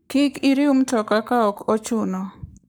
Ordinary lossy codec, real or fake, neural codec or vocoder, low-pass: none; fake; codec, 44.1 kHz, 7.8 kbps, Pupu-Codec; none